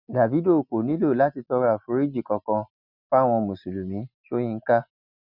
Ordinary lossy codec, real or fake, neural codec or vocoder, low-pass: none; real; none; 5.4 kHz